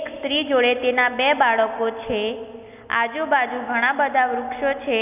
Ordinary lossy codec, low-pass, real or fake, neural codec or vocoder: none; 3.6 kHz; real; none